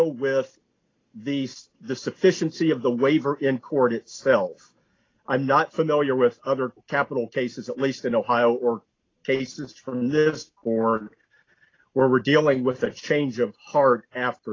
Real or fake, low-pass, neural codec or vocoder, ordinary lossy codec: real; 7.2 kHz; none; AAC, 32 kbps